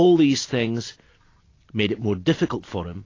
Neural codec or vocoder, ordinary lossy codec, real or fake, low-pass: none; AAC, 32 kbps; real; 7.2 kHz